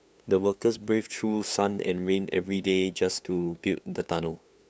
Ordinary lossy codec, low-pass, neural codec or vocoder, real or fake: none; none; codec, 16 kHz, 2 kbps, FunCodec, trained on LibriTTS, 25 frames a second; fake